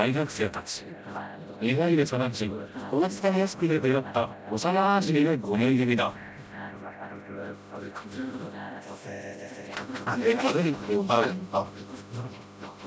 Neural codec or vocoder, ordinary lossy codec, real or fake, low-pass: codec, 16 kHz, 0.5 kbps, FreqCodec, smaller model; none; fake; none